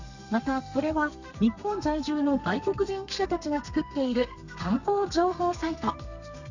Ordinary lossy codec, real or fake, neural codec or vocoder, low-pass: none; fake; codec, 32 kHz, 1.9 kbps, SNAC; 7.2 kHz